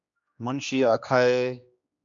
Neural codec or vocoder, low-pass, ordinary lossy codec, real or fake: codec, 16 kHz, 2 kbps, X-Codec, HuBERT features, trained on general audio; 7.2 kHz; MP3, 64 kbps; fake